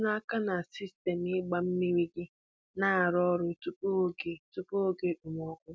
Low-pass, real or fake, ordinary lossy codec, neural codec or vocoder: none; real; none; none